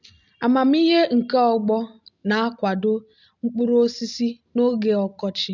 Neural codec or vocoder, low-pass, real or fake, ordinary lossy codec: none; 7.2 kHz; real; none